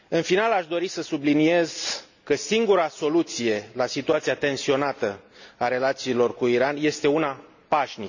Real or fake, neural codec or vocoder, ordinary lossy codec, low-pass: real; none; none; 7.2 kHz